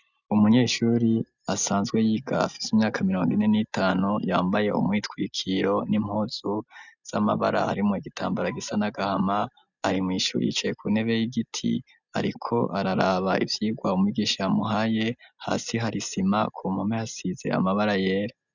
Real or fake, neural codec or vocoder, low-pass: real; none; 7.2 kHz